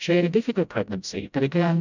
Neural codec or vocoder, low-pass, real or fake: codec, 16 kHz, 0.5 kbps, FreqCodec, smaller model; 7.2 kHz; fake